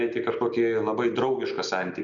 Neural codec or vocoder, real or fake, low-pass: none; real; 7.2 kHz